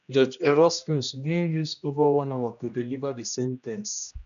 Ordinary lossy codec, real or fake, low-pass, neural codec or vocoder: none; fake; 7.2 kHz; codec, 16 kHz, 1 kbps, X-Codec, HuBERT features, trained on general audio